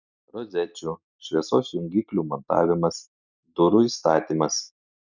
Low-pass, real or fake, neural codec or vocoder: 7.2 kHz; real; none